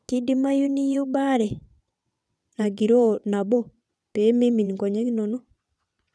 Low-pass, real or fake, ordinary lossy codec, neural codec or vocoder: none; fake; none; vocoder, 22.05 kHz, 80 mel bands, WaveNeXt